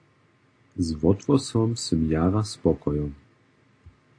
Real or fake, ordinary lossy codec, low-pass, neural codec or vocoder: real; AAC, 48 kbps; 9.9 kHz; none